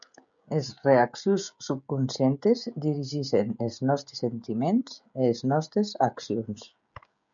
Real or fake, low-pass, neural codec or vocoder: fake; 7.2 kHz; codec, 16 kHz, 16 kbps, FreqCodec, smaller model